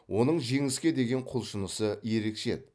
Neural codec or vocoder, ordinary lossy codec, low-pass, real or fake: none; none; none; real